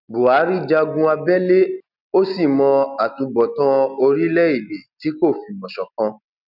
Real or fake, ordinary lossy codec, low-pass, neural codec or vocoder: real; none; 5.4 kHz; none